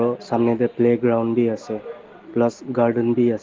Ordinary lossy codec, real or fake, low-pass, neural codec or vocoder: Opus, 16 kbps; real; 7.2 kHz; none